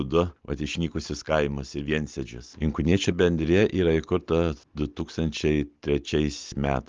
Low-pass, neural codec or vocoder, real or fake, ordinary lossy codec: 7.2 kHz; none; real; Opus, 32 kbps